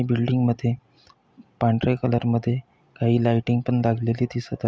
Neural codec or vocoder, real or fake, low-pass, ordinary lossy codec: none; real; none; none